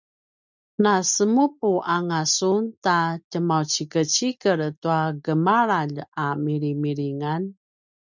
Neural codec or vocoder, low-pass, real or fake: none; 7.2 kHz; real